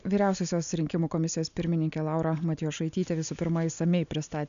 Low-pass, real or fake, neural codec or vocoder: 7.2 kHz; real; none